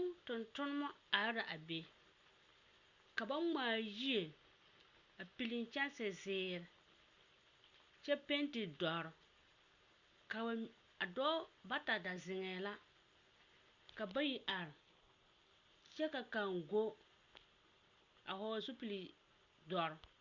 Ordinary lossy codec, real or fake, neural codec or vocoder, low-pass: Opus, 64 kbps; real; none; 7.2 kHz